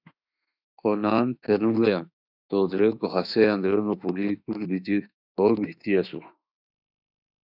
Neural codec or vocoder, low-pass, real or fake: autoencoder, 48 kHz, 32 numbers a frame, DAC-VAE, trained on Japanese speech; 5.4 kHz; fake